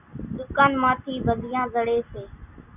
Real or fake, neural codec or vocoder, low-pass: real; none; 3.6 kHz